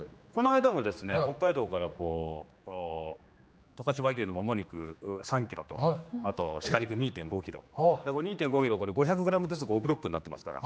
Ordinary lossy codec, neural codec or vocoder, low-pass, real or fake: none; codec, 16 kHz, 2 kbps, X-Codec, HuBERT features, trained on general audio; none; fake